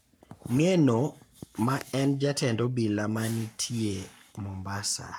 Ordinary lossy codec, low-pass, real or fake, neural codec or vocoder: none; none; fake; codec, 44.1 kHz, 7.8 kbps, Pupu-Codec